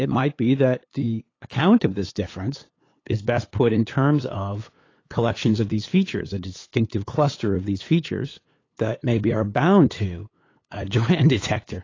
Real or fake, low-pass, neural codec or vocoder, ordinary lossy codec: fake; 7.2 kHz; codec, 16 kHz, 8 kbps, FunCodec, trained on LibriTTS, 25 frames a second; AAC, 32 kbps